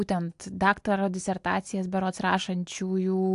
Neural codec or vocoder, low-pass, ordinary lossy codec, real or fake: none; 10.8 kHz; AAC, 64 kbps; real